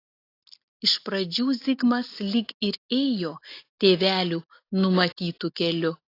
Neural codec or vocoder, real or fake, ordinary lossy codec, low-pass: none; real; AAC, 32 kbps; 5.4 kHz